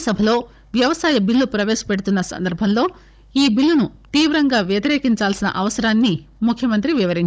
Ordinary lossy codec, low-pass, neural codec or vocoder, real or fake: none; none; codec, 16 kHz, 16 kbps, FunCodec, trained on Chinese and English, 50 frames a second; fake